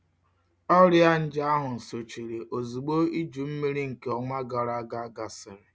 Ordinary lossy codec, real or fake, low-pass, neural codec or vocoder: none; real; none; none